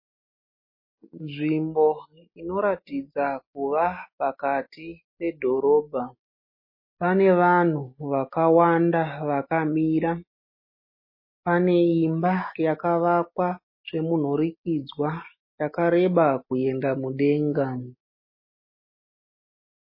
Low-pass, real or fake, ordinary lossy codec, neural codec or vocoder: 5.4 kHz; real; MP3, 24 kbps; none